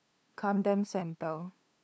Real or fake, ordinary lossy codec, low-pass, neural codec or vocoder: fake; none; none; codec, 16 kHz, 2 kbps, FunCodec, trained on LibriTTS, 25 frames a second